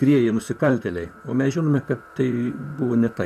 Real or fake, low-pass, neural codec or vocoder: fake; 14.4 kHz; vocoder, 44.1 kHz, 128 mel bands, Pupu-Vocoder